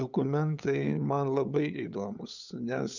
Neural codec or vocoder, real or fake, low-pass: codec, 16 kHz, 8 kbps, FunCodec, trained on LibriTTS, 25 frames a second; fake; 7.2 kHz